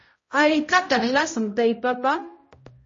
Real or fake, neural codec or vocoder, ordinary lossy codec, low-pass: fake; codec, 16 kHz, 0.5 kbps, X-Codec, HuBERT features, trained on balanced general audio; MP3, 32 kbps; 7.2 kHz